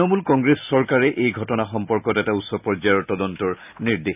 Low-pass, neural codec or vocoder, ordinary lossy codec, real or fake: 3.6 kHz; none; none; real